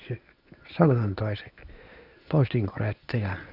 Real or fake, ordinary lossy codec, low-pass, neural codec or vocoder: fake; none; 5.4 kHz; codec, 24 kHz, 0.9 kbps, WavTokenizer, medium speech release version 1